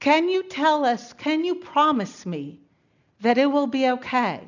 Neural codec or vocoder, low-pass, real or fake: none; 7.2 kHz; real